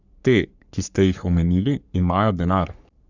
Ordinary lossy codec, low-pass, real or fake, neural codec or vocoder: none; 7.2 kHz; fake; codec, 44.1 kHz, 3.4 kbps, Pupu-Codec